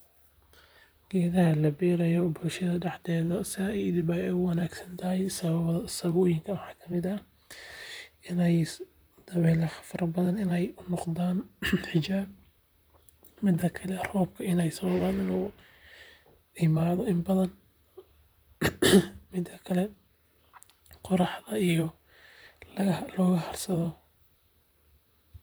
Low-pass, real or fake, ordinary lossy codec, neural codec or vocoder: none; fake; none; vocoder, 44.1 kHz, 128 mel bands, Pupu-Vocoder